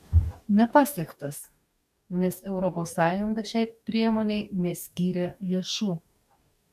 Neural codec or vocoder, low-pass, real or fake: codec, 44.1 kHz, 2.6 kbps, DAC; 14.4 kHz; fake